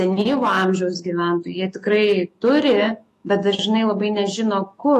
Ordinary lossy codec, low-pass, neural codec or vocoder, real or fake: AAC, 48 kbps; 14.4 kHz; autoencoder, 48 kHz, 128 numbers a frame, DAC-VAE, trained on Japanese speech; fake